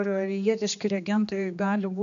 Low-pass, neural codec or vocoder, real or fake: 7.2 kHz; codec, 16 kHz, 2 kbps, X-Codec, HuBERT features, trained on general audio; fake